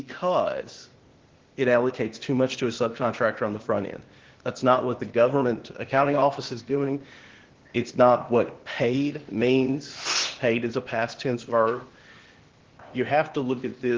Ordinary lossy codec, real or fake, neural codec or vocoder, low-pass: Opus, 16 kbps; fake; codec, 16 kHz, 0.8 kbps, ZipCodec; 7.2 kHz